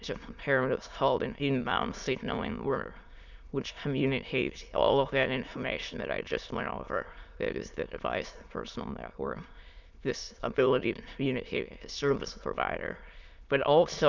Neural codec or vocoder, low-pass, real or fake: autoencoder, 22.05 kHz, a latent of 192 numbers a frame, VITS, trained on many speakers; 7.2 kHz; fake